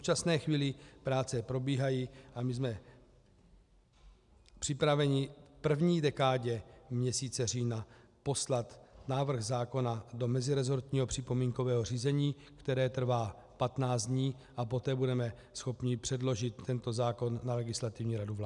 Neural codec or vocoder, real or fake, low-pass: none; real; 10.8 kHz